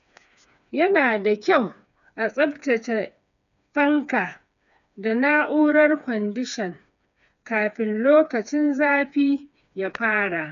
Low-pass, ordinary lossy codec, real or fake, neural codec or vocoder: 7.2 kHz; none; fake; codec, 16 kHz, 4 kbps, FreqCodec, smaller model